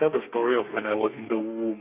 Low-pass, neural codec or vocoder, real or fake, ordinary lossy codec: 3.6 kHz; codec, 32 kHz, 1.9 kbps, SNAC; fake; none